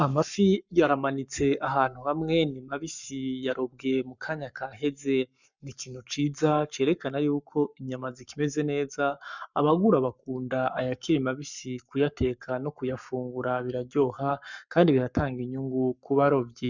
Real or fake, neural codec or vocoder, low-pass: fake; codec, 44.1 kHz, 7.8 kbps, Pupu-Codec; 7.2 kHz